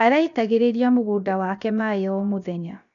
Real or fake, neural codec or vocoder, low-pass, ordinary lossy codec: fake; codec, 16 kHz, 0.7 kbps, FocalCodec; 7.2 kHz; none